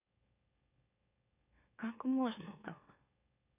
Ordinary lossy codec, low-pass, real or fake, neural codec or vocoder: none; 3.6 kHz; fake; autoencoder, 44.1 kHz, a latent of 192 numbers a frame, MeloTTS